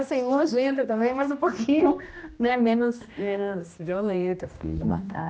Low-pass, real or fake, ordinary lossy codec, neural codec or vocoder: none; fake; none; codec, 16 kHz, 1 kbps, X-Codec, HuBERT features, trained on general audio